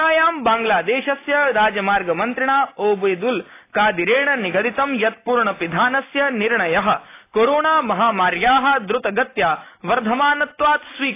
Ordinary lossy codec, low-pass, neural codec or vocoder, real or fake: AAC, 24 kbps; 3.6 kHz; none; real